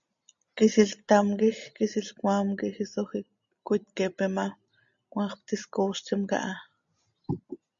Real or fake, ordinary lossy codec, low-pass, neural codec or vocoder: real; MP3, 64 kbps; 7.2 kHz; none